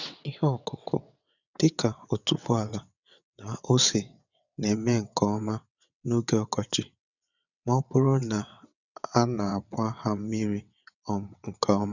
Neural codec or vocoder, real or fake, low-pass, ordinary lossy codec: vocoder, 22.05 kHz, 80 mel bands, WaveNeXt; fake; 7.2 kHz; none